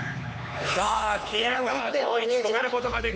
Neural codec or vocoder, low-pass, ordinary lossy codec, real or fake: codec, 16 kHz, 4 kbps, X-Codec, HuBERT features, trained on LibriSpeech; none; none; fake